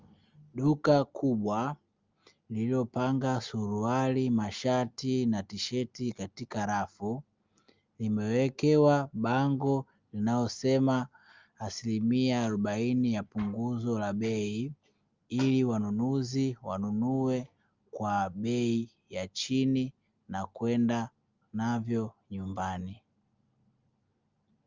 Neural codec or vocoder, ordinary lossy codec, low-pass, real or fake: none; Opus, 24 kbps; 7.2 kHz; real